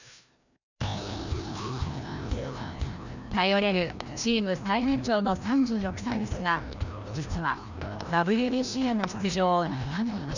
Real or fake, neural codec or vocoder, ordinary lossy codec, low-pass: fake; codec, 16 kHz, 1 kbps, FreqCodec, larger model; none; 7.2 kHz